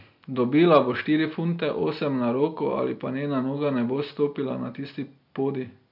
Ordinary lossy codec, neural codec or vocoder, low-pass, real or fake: none; none; 5.4 kHz; real